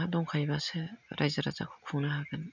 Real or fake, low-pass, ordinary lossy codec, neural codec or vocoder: real; 7.2 kHz; none; none